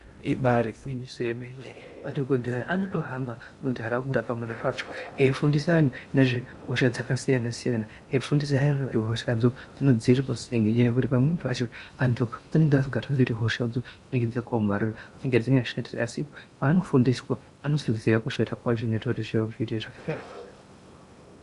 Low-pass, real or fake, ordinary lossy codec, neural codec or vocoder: 10.8 kHz; fake; Opus, 64 kbps; codec, 16 kHz in and 24 kHz out, 0.6 kbps, FocalCodec, streaming, 2048 codes